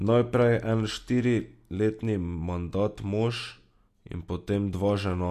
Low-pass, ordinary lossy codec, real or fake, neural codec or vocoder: 14.4 kHz; MP3, 64 kbps; real; none